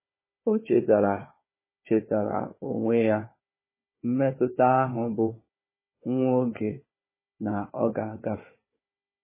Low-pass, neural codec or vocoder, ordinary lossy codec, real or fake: 3.6 kHz; codec, 16 kHz, 4 kbps, FunCodec, trained on Chinese and English, 50 frames a second; MP3, 16 kbps; fake